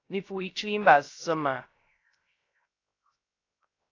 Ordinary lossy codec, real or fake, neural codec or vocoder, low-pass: AAC, 32 kbps; fake; codec, 16 kHz, 0.3 kbps, FocalCodec; 7.2 kHz